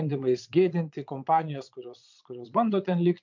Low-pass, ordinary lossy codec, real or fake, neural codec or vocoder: 7.2 kHz; MP3, 64 kbps; fake; vocoder, 22.05 kHz, 80 mel bands, Vocos